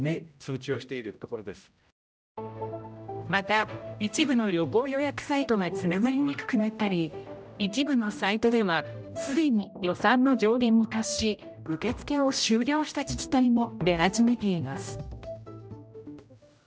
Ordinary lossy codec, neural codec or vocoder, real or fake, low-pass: none; codec, 16 kHz, 0.5 kbps, X-Codec, HuBERT features, trained on general audio; fake; none